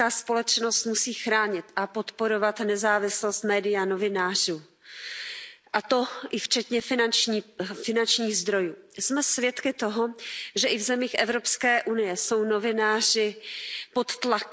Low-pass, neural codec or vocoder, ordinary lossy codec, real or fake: none; none; none; real